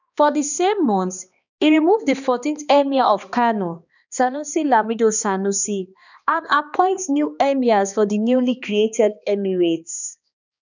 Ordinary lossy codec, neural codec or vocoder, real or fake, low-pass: none; codec, 16 kHz, 2 kbps, X-Codec, HuBERT features, trained on balanced general audio; fake; 7.2 kHz